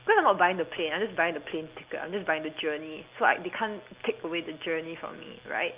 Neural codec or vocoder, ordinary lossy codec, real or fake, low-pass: none; Opus, 24 kbps; real; 3.6 kHz